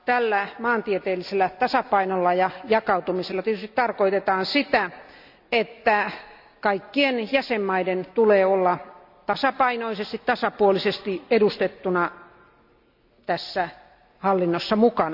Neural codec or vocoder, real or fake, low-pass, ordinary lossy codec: none; real; 5.4 kHz; AAC, 48 kbps